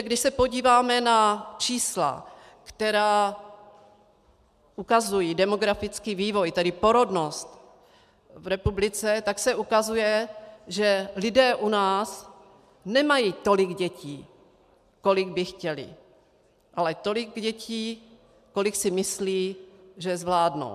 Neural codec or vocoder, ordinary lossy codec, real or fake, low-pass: none; MP3, 96 kbps; real; 14.4 kHz